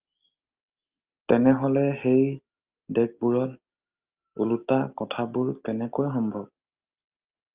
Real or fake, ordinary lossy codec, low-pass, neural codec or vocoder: real; Opus, 24 kbps; 3.6 kHz; none